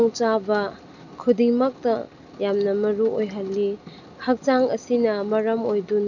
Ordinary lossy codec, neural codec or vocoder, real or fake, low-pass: none; none; real; 7.2 kHz